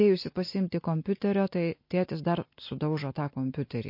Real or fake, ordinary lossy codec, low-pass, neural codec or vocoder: real; MP3, 32 kbps; 5.4 kHz; none